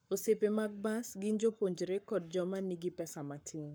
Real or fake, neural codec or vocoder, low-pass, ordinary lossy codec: fake; codec, 44.1 kHz, 7.8 kbps, Pupu-Codec; none; none